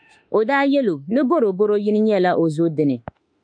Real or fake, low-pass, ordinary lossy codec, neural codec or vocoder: fake; 9.9 kHz; MP3, 64 kbps; autoencoder, 48 kHz, 32 numbers a frame, DAC-VAE, trained on Japanese speech